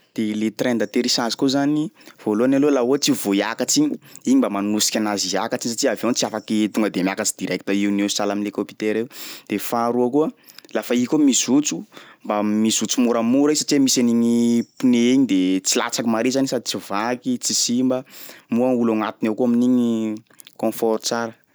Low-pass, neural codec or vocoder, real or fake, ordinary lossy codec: none; none; real; none